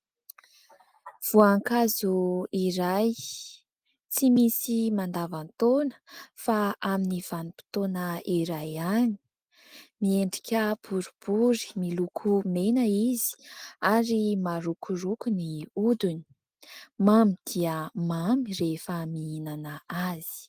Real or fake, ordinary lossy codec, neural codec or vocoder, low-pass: real; Opus, 24 kbps; none; 14.4 kHz